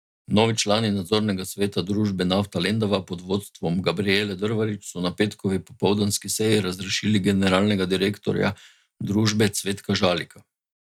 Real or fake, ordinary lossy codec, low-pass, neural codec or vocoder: real; none; 19.8 kHz; none